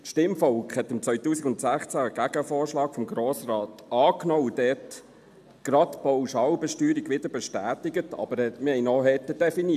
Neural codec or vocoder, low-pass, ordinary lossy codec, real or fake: none; 14.4 kHz; AAC, 96 kbps; real